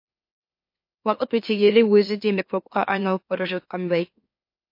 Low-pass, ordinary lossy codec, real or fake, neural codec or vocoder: 5.4 kHz; MP3, 32 kbps; fake; autoencoder, 44.1 kHz, a latent of 192 numbers a frame, MeloTTS